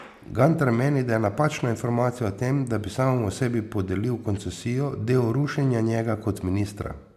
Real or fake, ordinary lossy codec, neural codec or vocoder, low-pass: real; MP3, 96 kbps; none; 14.4 kHz